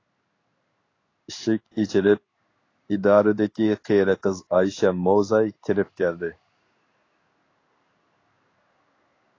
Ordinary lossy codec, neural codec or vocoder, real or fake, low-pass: AAC, 32 kbps; codec, 16 kHz in and 24 kHz out, 1 kbps, XY-Tokenizer; fake; 7.2 kHz